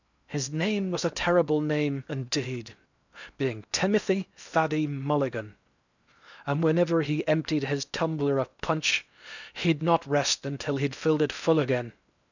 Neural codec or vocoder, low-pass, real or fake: codec, 16 kHz in and 24 kHz out, 0.8 kbps, FocalCodec, streaming, 65536 codes; 7.2 kHz; fake